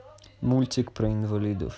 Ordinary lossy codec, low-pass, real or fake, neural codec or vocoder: none; none; real; none